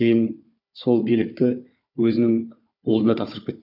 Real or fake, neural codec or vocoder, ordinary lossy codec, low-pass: fake; codec, 16 kHz, 4 kbps, FunCodec, trained on Chinese and English, 50 frames a second; none; 5.4 kHz